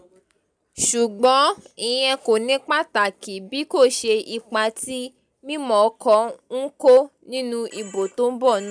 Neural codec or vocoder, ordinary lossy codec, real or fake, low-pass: none; none; real; 9.9 kHz